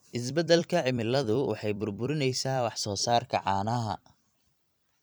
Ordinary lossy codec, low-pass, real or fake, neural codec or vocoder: none; none; fake; vocoder, 44.1 kHz, 128 mel bands every 256 samples, BigVGAN v2